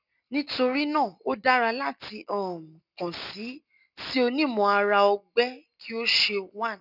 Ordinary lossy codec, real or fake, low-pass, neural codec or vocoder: none; real; 5.4 kHz; none